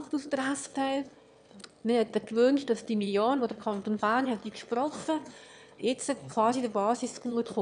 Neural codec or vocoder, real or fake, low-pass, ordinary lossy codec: autoencoder, 22.05 kHz, a latent of 192 numbers a frame, VITS, trained on one speaker; fake; 9.9 kHz; none